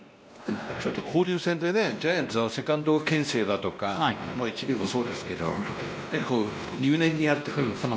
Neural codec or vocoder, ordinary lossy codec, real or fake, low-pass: codec, 16 kHz, 1 kbps, X-Codec, WavLM features, trained on Multilingual LibriSpeech; none; fake; none